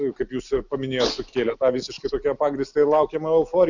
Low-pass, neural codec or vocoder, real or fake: 7.2 kHz; none; real